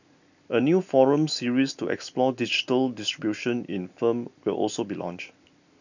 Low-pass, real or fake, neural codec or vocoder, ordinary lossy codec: 7.2 kHz; real; none; none